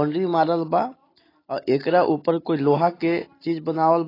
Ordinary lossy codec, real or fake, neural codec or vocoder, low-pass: AAC, 24 kbps; real; none; 5.4 kHz